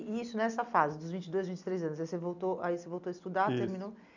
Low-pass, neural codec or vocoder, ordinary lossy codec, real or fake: 7.2 kHz; none; none; real